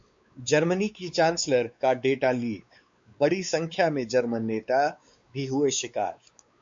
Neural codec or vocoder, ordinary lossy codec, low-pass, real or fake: codec, 16 kHz, 4 kbps, X-Codec, WavLM features, trained on Multilingual LibriSpeech; MP3, 48 kbps; 7.2 kHz; fake